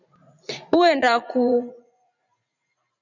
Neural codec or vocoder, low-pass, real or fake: vocoder, 44.1 kHz, 80 mel bands, Vocos; 7.2 kHz; fake